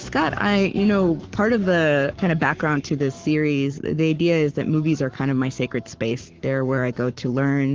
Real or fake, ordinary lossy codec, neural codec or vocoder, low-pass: fake; Opus, 16 kbps; codec, 44.1 kHz, 7.8 kbps, Pupu-Codec; 7.2 kHz